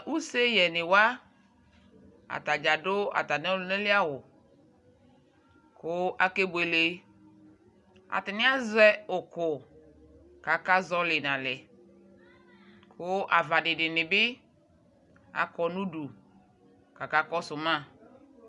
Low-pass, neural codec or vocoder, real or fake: 10.8 kHz; none; real